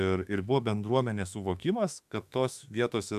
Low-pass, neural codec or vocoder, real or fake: 14.4 kHz; autoencoder, 48 kHz, 32 numbers a frame, DAC-VAE, trained on Japanese speech; fake